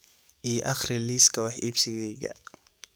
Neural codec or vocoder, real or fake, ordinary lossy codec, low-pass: codec, 44.1 kHz, 7.8 kbps, DAC; fake; none; none